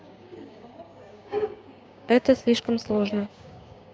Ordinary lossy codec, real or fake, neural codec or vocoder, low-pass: none; fake; codec, 16 kHz, 6 kbps, DAC; none